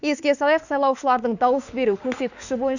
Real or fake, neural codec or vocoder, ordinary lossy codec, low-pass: fake; autoencoder, 48 kHz, 32 numbers a frame, DAC-VAE, trained on Japanese speech; none; 7.2 kHz